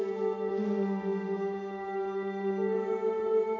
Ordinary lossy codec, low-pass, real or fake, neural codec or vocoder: AAC, 32 kbps; 7.2 kHz; real; none